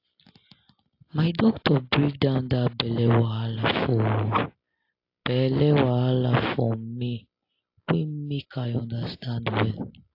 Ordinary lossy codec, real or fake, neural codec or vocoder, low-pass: AAC, 32 kbps; real; none; 5.4 kHz